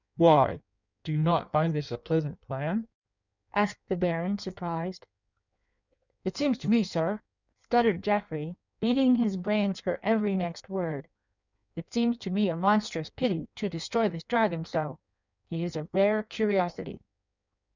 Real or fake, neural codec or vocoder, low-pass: fake; codec, 16 kHz in and 24 kHz out, 1.1 kbps, FireRedTTS-2 codec; 7.2 kHz